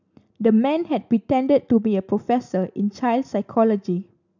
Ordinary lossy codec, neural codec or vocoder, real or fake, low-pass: none; none; real; 7.2 kHz